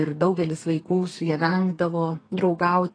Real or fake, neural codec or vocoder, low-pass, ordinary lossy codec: fake; codec, 32 kHz, 1.9 kbps, SNAC; 9.9 kHz; AAC, 32 kbps